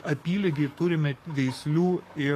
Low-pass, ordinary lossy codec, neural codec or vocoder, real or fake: 14.4 kHz; AAC, 48 kbps; codec, 44.1 kHz, 7.8 kbps, DAC; fake